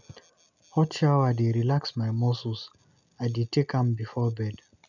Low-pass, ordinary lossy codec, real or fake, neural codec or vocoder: 7.2 kHz; none; real; none